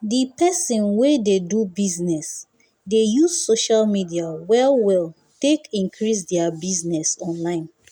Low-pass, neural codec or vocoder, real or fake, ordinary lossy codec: none; none; real; none